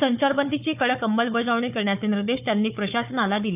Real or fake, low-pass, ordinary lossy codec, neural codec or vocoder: fake; 3.6 kHz; none; codec, 16 kHz, 4 kbps, FunCodec, trained on Chinese and English, 50 frames a second